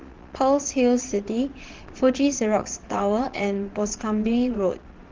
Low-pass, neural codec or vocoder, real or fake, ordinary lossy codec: 7.2 kHz; vocoder, 22.05 kHz, 80 mel bands, Vocos; fake; Opus, 16 kbps